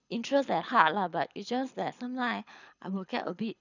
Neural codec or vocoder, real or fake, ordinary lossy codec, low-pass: codec, 24 kHz, 6 kbps, HILCodec; fake; none; 7.2 kHz